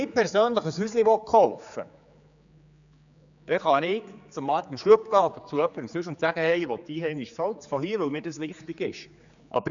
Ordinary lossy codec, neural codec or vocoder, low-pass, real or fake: none; codec, 16 kHz, 4 kbps, X-Codec, HuBERT features, trained on general audio; 7.2 kHz; fake